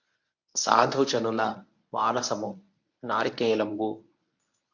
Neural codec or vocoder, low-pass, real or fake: codec, 24 kHz, 0.9 kbps, WavTokenizer, medium speech release version 1; 7.2 kHz; fake